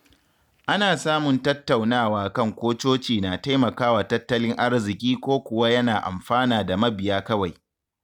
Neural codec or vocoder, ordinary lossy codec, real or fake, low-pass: none; none; real; 19.8 kHz